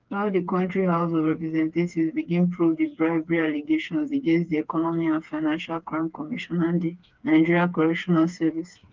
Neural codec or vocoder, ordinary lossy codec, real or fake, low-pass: codec, 16 kHz, 4 kbps, FreqCodec, smaller model; Opus, 24 kbps; fake; 7.2 kHz